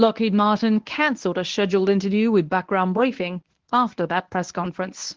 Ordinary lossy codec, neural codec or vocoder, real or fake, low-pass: Opus, 16 kbps; codec, 24 kHz, 0.9 kbps, WavTokenizer, medium speech release version 1; fake; 7.2 kHz